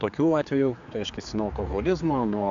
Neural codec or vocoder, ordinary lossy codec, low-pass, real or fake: codec, 16 kHz, 4 kbps, X-Codec, HuBERT features, trained on general audio; AAC, 64 kbps; 7.2 kHz; fake